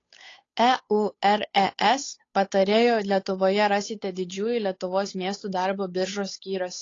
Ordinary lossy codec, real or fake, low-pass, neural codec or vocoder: AAC, 32 kbps; fake; 7.2 kHz; codec, 16 kHz, 8 kbps, FunCodec, trained on Chinese and English, 25 frames a second